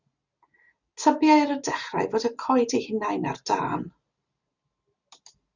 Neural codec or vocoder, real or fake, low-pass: none; real; 7.2 kHz